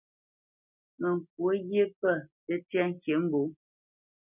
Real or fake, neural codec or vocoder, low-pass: real; none; 3.6 kHz